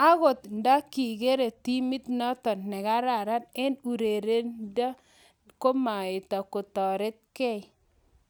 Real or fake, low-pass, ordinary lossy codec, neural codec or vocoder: real; none; none; none